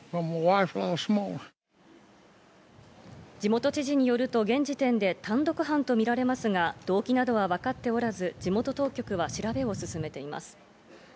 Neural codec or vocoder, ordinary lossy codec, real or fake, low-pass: none; none; real; none